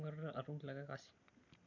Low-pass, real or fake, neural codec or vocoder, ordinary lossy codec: 7.2 kHz; real; none; AAC, 48 kbps